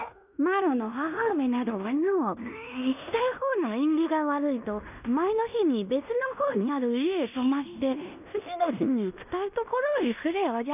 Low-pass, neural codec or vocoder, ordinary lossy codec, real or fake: 3.6 kHz; codec, 16 kHz in and 24 kHz out, 0.9 kbps, LongCat-Audio-Codec, fine tuned four codebook decoder; none; fake